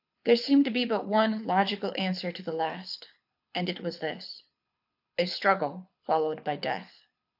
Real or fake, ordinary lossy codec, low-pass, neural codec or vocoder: fake; AAC, 48 kbps; 5.4 kHz; codec, 24 kHz, 6 kbps, HILCodec